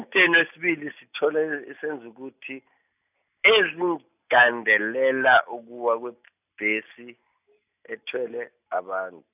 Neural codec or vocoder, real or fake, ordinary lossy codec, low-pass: none; real; none; 3.6 kHz